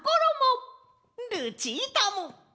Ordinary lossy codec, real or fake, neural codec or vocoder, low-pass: none; real; none; none